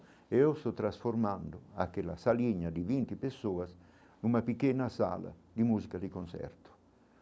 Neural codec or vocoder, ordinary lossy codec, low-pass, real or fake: none; none; none; real